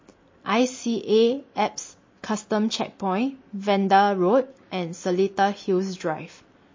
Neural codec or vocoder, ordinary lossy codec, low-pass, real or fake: none; MP3, 32 kbps; 7.2 kHz; real